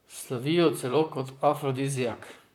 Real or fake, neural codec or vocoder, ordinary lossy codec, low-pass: fake; vocoder, 44.1 kHz, 128 mel bands, Pupu-Vocoder; none; 19.8 kHz